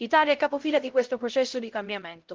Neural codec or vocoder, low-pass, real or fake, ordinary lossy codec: codec, 16 kHz, 0.5 kbps, X-Codec, HuBERT features, trained on LibriSpeech; 7.2 kHz; fake; Opus, 16 kbps